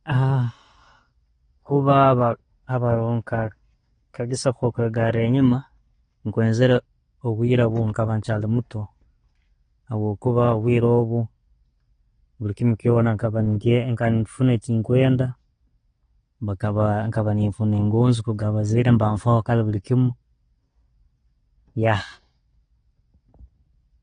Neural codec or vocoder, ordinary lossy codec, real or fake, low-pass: none; AAC, 32 kbps; real; 9.9 kHz